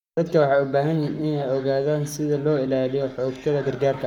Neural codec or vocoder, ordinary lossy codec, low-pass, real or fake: codec, 44.1 kHz, 7.8 kbps, Pupu-Codec; none; 19.8 kHz; fake